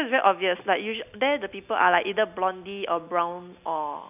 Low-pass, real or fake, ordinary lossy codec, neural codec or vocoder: 3.6 kHz; real; none; none